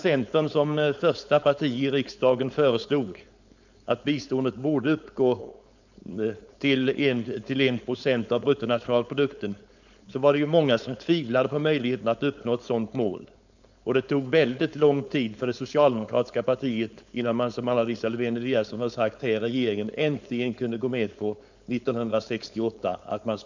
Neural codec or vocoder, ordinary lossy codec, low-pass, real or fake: codec, 16 kHz, 4.8 kbps, FACodec; none; 7.2 kHz; fake